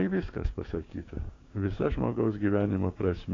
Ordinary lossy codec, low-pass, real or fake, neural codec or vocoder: AAC, 32 kbps; 7.2 kHz; fake; codec, 16 kHz, 6 kbps, DAC